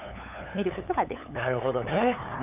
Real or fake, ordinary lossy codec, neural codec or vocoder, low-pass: fake; none; codec, 16 kHz, 8 kbps, FunCodec, trained on LibriTTS, 25 frames a second; 3.6 kHz